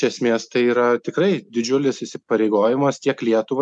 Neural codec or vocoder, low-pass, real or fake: none; 10.8 kHz; real